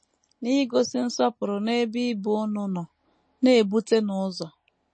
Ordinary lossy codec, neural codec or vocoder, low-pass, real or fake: MP3, 32 kbps; none; 9.9 kHz; real